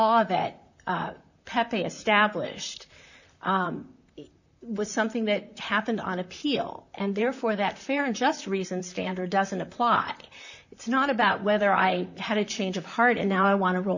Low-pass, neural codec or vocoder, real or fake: 7.2 kHz; vocoder, 44.1 kHz, 128 mel bands, Pupu-Vocoder; fake